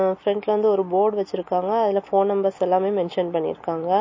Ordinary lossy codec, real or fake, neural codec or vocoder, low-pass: MP3, 32 kbps; real; none; 7.2 kHz